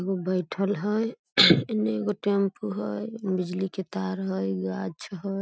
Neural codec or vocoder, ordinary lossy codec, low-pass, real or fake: none; none; none; real